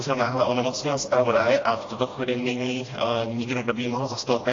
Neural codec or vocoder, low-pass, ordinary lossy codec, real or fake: codec, 16 kHz, 1 kbps, FreqCodec, smaller model; 7.2 kHz; AAC, 32 kbps; fake